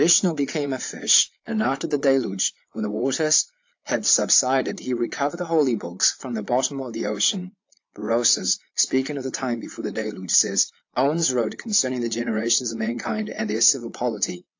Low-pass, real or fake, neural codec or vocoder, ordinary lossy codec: 7.2 kHz; fake; vocoder, 22.05 kHz, 80 mel bands, Vocos; AAC, 48 kbps